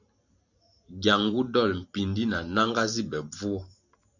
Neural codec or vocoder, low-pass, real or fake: vocoder, 44.1 kHz, 128 mel bands every 512 samples, BigVGAN v2; 7.2 kHz; fake